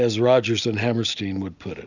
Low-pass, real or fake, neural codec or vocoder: 7.2 kHz; real; none